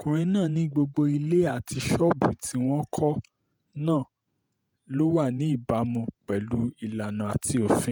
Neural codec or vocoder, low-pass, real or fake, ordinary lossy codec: vocoder, 48 kHz, 128 mel bands, Vocos; none; fake; none